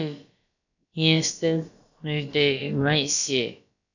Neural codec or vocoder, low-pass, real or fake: codec, 16 kHz, about 1 kbps, DyCAST, with the encoder's durations; 7.2 kHz; fake